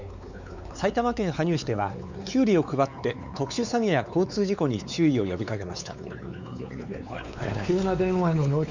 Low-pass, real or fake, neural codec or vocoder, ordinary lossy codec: 7.2 kHz; fake; codec, 16 kHz, 4 kbps, X-Codec, WavLM features, trained on Multilingual LibriSpeech; none